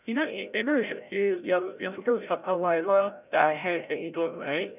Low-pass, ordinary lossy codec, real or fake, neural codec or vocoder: 3.6 kHz; none; fake; codec, 16 kHz, 0.5 kbps, FreqCodec, larger model